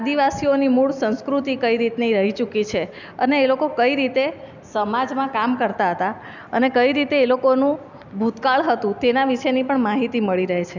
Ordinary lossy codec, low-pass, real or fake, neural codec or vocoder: none; 7.2 kHz; real; none